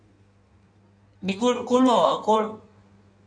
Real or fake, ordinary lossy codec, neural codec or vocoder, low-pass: fake; none; codec, 16 kHz in and 24 kHz out, 1.1 kbps, FireRedTTS-2 codec; 9.9 kHz